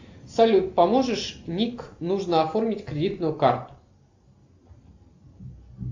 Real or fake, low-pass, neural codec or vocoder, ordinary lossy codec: real; 7.2 kHz; none; AAC, 48 kbps